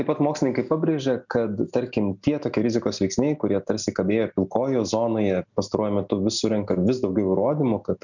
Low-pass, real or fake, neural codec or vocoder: 7.2 kHz; real; none